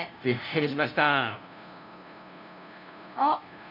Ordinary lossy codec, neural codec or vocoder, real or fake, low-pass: none; codec, 16 kHz, 0.5 kbps, FunCodec, trained on LibriTTS, 25 frames a second; fake; 5.4 kHz